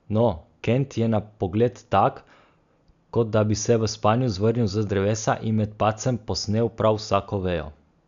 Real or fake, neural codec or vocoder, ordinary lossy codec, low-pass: real; none; none; 7.2 kHz